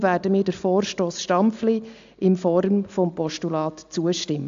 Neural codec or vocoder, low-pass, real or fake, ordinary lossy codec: none; 7.2 kHz; real; AAC, 64 kbps